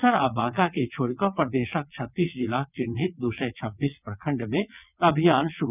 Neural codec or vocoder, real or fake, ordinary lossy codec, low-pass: vocoder, 22.05 kHz, 80 mel bands, WaveNeXt; fake; none; 3.6 kHz